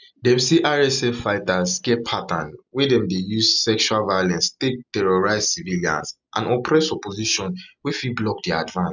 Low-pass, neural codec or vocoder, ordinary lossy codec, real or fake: 7.2 kHz; none; none; real